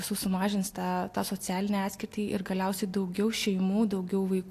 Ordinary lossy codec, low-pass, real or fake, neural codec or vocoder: AAC, 64 kbps; 14.4 kHz; real; none